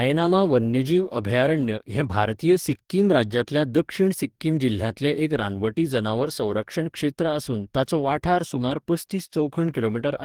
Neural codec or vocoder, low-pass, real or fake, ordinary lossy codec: codec, 44.1 kHz, 2.6 kbps, DAC; 19.8 kHz; fake; Opus, 24 kbps